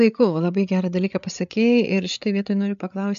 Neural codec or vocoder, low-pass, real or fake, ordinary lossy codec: codec, 16 kHz, 8 kbps, FreqCodec, larger model; 7.2 kHz; fake; MP3, 64 kbps